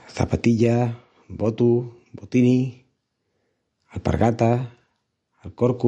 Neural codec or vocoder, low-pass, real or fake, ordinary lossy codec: none; 14.4 kHz; real; MP3, 48 kbps